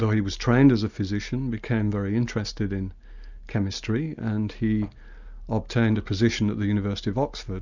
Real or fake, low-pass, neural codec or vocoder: real; 7.2 kHz; none